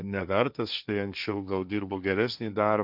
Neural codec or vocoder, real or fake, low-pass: codec, 16 kHz, 1.1 kbps, Voila-Tokenizer; fake; 5.4 kHz